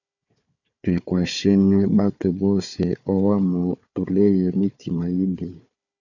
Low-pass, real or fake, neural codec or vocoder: 7.2 kHz; fake; codec, 16 kHz, 4 kbps, FunCodec, trained on Chinese and English, 50 frames a second